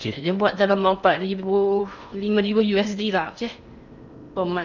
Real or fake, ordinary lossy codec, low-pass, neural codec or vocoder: fake; none; 7.2 kHz; codec, 16 kHz in and 24 kHz out, 0.8 kbps, FocalCodec, streaming, 65536 codes